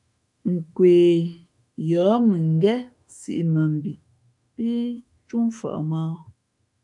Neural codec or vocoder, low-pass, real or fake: autoencoder, 48 kHz, 32 numbers a frame, DAC-VAE, trained on Japanese speech; 10.8 kHz; fake